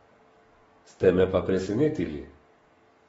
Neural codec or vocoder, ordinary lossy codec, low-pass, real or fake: codec, 44.1 kHz, 7.8 kbps, DAC; AAC, 24 kbps; 19.8 kHz; fake